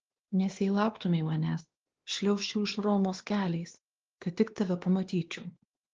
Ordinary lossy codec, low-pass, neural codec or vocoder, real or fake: Opus, 16 kbps; 7.2 kHz; codec, 16 kHz, 2 kbps, X-Codec, WavLM features, trained on Multilingual LibriSpeech; fake